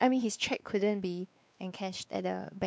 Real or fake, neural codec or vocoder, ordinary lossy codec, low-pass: fake; codec, 16 kHz, 2 kbps, X-Codec, WavLM features, trained on Multilingual LibriSpeech; none; none